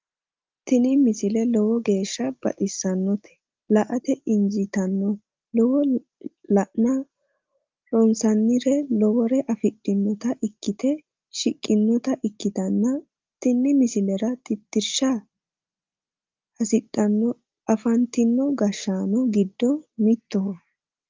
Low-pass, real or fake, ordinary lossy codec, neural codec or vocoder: 7.2 kHz; real; Opus, 24 kbps; none